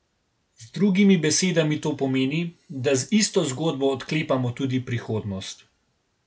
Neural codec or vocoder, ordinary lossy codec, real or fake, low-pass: none; none; real; none